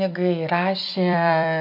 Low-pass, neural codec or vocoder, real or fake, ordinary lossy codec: 5.4 kHz; vocoder, 44.1 kHz, 128 mel bands every 256 samples, BigVGAN v2; fake; AAC, 48 kbps